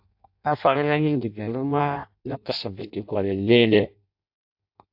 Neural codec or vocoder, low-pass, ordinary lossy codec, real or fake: codec, 16 kHz in and 24 kHz out, 0.6 kbps, FireRedTTS-2 codec; 5.4 kHz; AAC, 48 kbps; fake